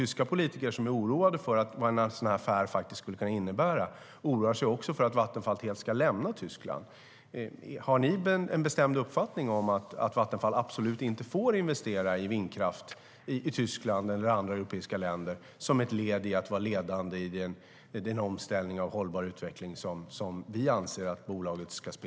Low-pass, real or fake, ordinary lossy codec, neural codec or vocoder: none; real; none; none